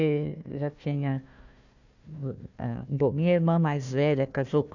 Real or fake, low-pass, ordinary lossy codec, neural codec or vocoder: fake; 7.2 kHz; none; codec, 16 kHz, 1 kbps, FunCodec, trained on Chinese and English, 50 frames a second